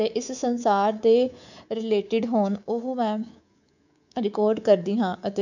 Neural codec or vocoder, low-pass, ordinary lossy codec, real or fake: codec, 24 kHz, 3.1 kbps, DualCodec; 7.2 kHz; none; fake